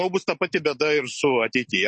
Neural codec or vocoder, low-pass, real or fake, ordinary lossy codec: none; 10.8 kHz; real; MP3, 32 kbps